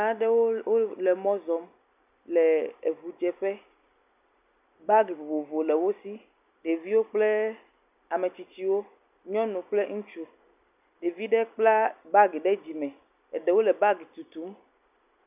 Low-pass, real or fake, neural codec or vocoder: 3.6 kHz; real; none